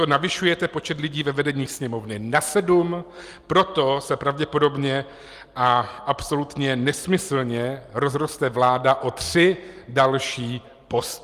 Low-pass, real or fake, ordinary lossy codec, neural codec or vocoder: 14.4 kHz; real; Opus, 32 kbps; none